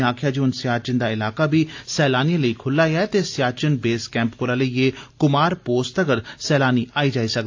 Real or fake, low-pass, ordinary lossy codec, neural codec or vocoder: real; 7.2 kHz; AAC, 48 kbps; none